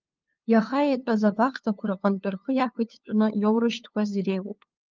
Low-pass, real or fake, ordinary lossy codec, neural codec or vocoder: 7.2 kHz; fake; Opus, 24 kbps; codec, 16 kHz, 2 kbps, FunCodec, trained on LibriTTS, 25 frames a second